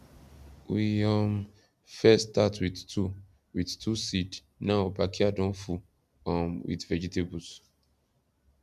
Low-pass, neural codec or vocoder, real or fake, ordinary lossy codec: 14.4 kHz; none; real; none